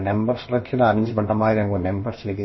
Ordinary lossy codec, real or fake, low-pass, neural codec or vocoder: MP3, 24 kbps; fake; 7.2 kHz; codec, 16 kHz, about 1 kbps, DyCAST, with the encoder's durations